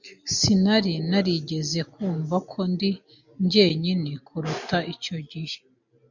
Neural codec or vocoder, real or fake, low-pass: none; real; 7.2 kHz